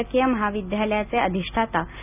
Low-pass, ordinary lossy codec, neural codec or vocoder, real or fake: 3.6 kHz; none; none; real